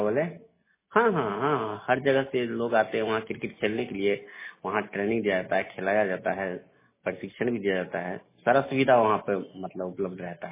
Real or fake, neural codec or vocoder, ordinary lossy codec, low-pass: real; none; MP3, 16 kbps; 3.6 kHz